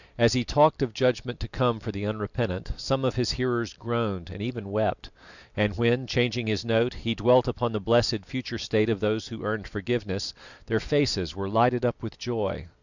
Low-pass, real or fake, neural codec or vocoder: 7.2 kHz; real; none